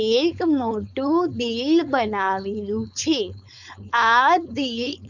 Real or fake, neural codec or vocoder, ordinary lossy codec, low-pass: fake; codec, 16 kHz, 4.8 kbps, FACodec; none; 7.2 kHz